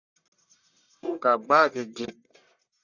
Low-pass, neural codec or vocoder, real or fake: 7.2 kHz; codec, 44.1 kHz, 1.7 kbps, Pupu-Codec; fake